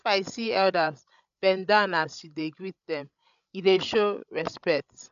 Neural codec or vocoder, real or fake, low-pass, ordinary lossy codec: codec, 16 kHz, 16 kbps, FreqCodec, larger model; fake; 7.2 kHz; none